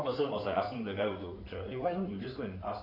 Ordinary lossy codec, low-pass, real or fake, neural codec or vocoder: none; 5.4 kHz; fake; codec, 16 kHz in and 24 kHz out, 2.2 kbps, FireRedTTS-2 codec